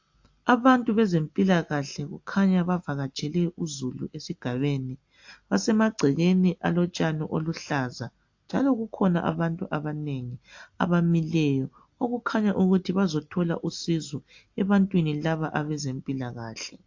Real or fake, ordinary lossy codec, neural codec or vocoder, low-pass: real; AAC, 48 kbps; none; 7.2 kHz